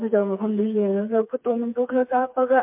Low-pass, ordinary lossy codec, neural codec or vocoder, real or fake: 3.6 kHz; MP3, 32 kbps; codec, 16 kHz, 4 kbps, FreqCodec, smaller model; fake